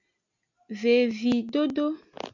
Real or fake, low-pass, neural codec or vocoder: real; 7.2 kHz; none